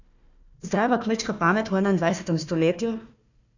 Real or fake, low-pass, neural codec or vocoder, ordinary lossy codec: fake; 7.2 kHz; codec, 16 kHz, 1 kbps, FunCodec, trained on Chinese and English, 50 frames a second; none